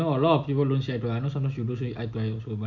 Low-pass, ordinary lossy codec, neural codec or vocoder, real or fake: 7.2 kHz; none; none; real